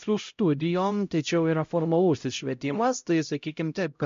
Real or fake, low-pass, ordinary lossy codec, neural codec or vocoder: fake; 7.2 kHz; MP3, 48 kbps; codec, 16 kHz, 0.5 kbps, X-Codec, HuBERT features, trained on LibriSpeech